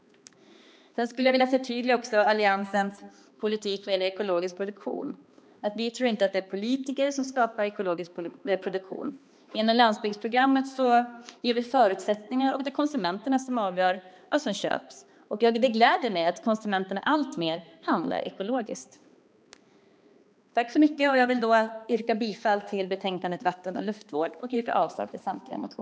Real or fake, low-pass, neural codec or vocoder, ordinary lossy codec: fake; none; codec, 16 kHz, 2 kbps, X-Codec, HuBERT features, trained on balanced general audio; none